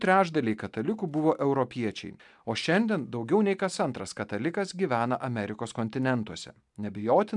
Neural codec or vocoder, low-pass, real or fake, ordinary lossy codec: none; 10.8 kHz; real; MP3, 96 kbps